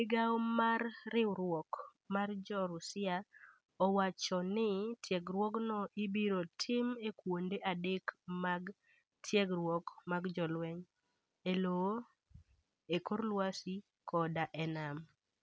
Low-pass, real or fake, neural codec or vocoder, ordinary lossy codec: none; real; none; none